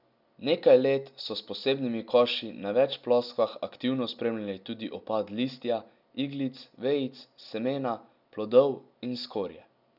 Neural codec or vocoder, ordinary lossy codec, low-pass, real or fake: none; none; 5.4 kHz; real